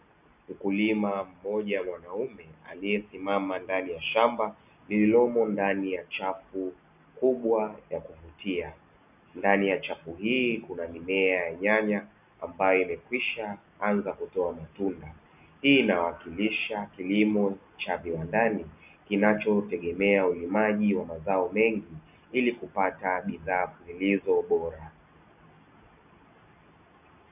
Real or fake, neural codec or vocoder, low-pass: real; none; 3.6 kHz